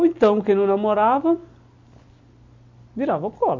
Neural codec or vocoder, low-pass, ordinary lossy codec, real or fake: none; 7.2 kHz; MP3, 48 kbps; real